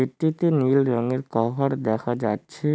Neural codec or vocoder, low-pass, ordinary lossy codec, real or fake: none; none; none; real